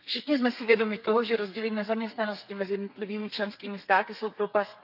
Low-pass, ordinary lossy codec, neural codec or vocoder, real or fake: 5.4 kHz; none; codec, 32 kHz, 1.9 kbps, SNAC; fake